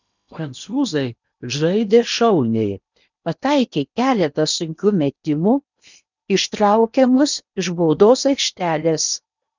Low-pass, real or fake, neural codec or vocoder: 7.2 kHz; fake; codec, 16 kHz in and 24 kHz out, 0.8 kbps, FocalCodec, streaming, 65536 codes